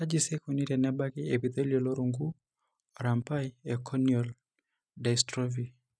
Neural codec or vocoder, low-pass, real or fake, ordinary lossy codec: none; none; real; none